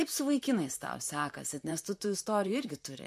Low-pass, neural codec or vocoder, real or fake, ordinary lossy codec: 14.4 kHz; vocoder, 44.1 kHz, 128 mel bands, Pupu-Vocoder; fake; MP3, 64 kbps